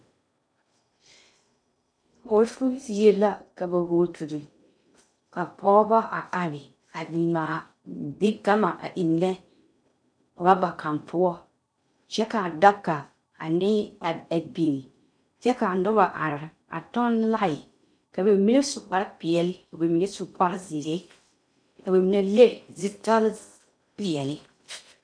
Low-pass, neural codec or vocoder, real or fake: 9.9 kHz; codec, 16 kHz in and 24 kHz out, 0.6 kbps, FocalCodec, streaming, 2048 codes; fake